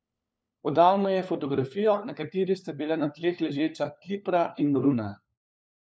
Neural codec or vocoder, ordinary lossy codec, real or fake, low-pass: codec, 16 kHz, 4 kbps, FunCodec, trained on LibriTTS, 50 frames a second; none; fake; none